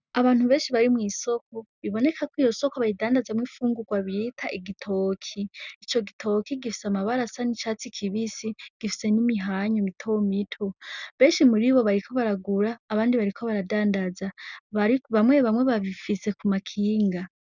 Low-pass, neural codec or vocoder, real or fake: 7.2 kHz; none; real